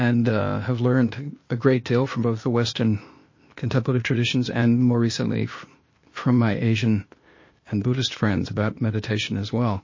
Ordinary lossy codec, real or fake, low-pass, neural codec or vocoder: MP3, 32 kbps; fake; 7.2 kHz; codec, 16 kHz, 0.8 kbps, ZipCodec